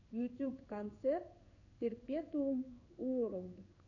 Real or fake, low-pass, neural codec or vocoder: fake; 7.2 kHz; codec, 16 kHz in and 24 kHz out, 1 kbps, XY-Tokenizer